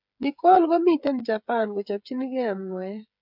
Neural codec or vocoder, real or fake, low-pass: codec, 16 kHz, 8 kbps, FreqCodec, smaller model; fake; 5.4 kHz